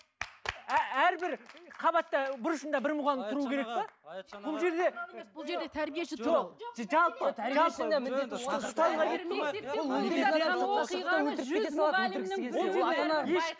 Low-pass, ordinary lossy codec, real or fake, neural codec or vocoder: none; none; real; none